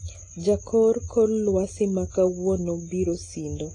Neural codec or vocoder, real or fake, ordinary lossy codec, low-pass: none; real; AAC, 32 kbps; 10.8 kHz